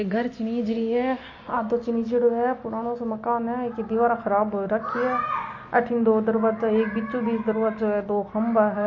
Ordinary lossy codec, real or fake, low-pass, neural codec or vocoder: MP3, 32 kbps; real; 7.2 kHz; none